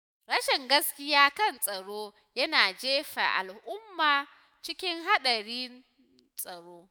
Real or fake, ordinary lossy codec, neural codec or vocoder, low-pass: fake; none; autoencoder, 48 kHz, 128 numbers a frame, DAC-VAE, trained on Japanese speech; none